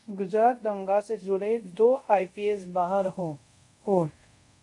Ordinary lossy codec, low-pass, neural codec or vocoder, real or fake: MP3, 96 kbps; 10.8 kHz; codec, 24 kHz, 0.5 kbps, DualCodec; fake